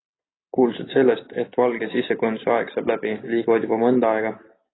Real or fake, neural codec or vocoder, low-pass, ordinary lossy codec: real; none; 7.2 kHz; AAC, 16 kbps